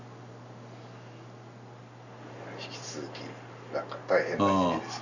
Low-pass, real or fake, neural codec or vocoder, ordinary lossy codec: 7.2 kHz; real; none; none